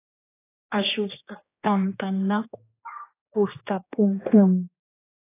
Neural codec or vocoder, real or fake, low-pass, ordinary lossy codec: codec, 16 kHz, 2 kbps, X-Codec, HuBERT features, trained on general audio; fake; 3.6 kHz; AAC, 24 kbps